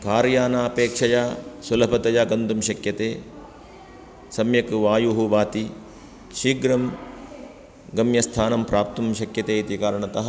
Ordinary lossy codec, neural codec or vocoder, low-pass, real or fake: none; none; none; real